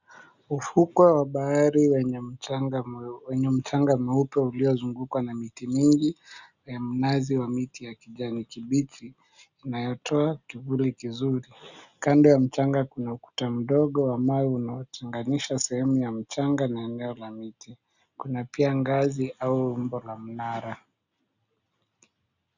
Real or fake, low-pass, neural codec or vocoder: real; 7.2 kHz; none